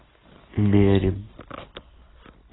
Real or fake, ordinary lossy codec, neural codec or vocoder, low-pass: real; AAC, 16 kbps; none; 7.2 kHz